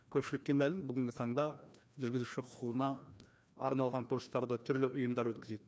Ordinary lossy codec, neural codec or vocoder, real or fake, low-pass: none; codec, 16 kHz, 1 kbps, FreqCodec, larger model; fake; none